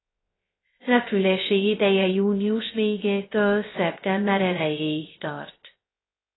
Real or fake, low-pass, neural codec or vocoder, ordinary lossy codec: fake; 7.2 kHz; codec, 16 kHz, 0.2 kbps, FocalCodec; AAC, 16 kbps